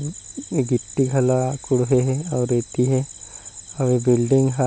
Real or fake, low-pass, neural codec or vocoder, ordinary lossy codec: real; none; none; none